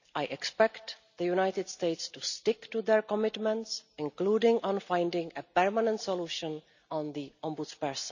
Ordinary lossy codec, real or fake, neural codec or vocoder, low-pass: none; real; none; 7.2 kHz